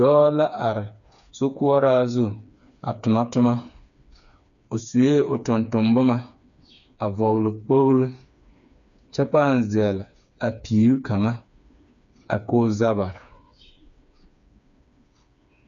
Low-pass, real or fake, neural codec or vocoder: 7.2 kHz; fake; codec, 16 kHz, 4 kbps, FreqCodec, smaller model